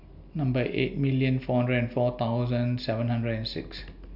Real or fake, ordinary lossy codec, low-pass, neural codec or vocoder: real; none; 5.4 kHz; none